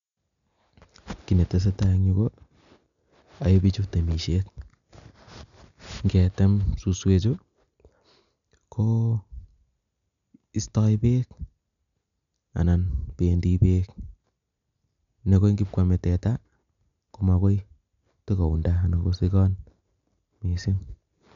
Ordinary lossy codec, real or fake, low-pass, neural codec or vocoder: none; real; 7.2 kHz; none